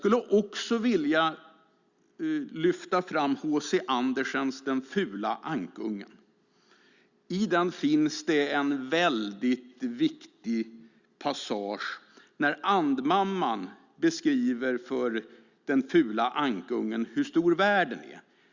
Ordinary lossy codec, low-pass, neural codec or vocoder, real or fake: Opus, 64 kbps; 7.2 kHz; none; real